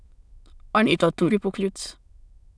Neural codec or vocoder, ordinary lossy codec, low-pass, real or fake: autoencoder, 22.05 kHz, a latent of 192 numbers a frame, VITS, trained on many speakers; none; none; fake